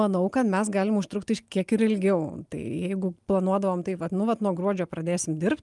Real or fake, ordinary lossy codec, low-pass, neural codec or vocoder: real; Opus, 32 kbps; 10.8 kHz; none